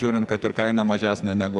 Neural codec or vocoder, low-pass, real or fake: codec, 44.1 kHz, 2.6 kbps, SNAC; 10.8 kHz; fake